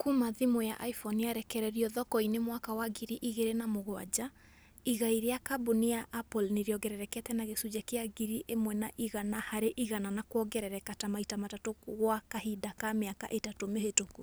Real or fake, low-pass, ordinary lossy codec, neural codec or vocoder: real; none; none; none